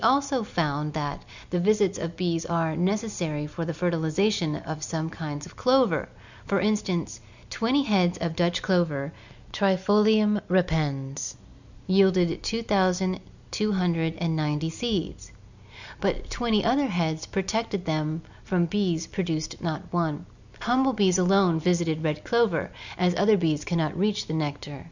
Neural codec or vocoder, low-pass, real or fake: none; 7.2 kHz; real